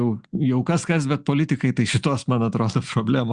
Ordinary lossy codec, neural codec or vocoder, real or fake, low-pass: Opus, 64 kbps; none; real; 9.9 kHz